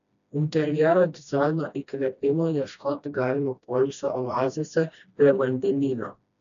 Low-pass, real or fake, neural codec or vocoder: 7.2 kHz; fake; codec, 16 kHz, 1 kbps, FreqCodec, smaller model